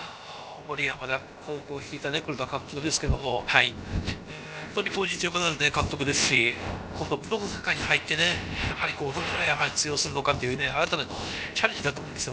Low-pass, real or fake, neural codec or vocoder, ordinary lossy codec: none; fake; codec, 16 kHz, about 1 kbps, DyCAST, with the encoder's durations; none